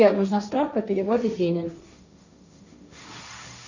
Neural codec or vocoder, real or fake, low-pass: codec, 16 kHz, 1.1 kbps, Voila-Tokenizer; fake; 7.2 kHz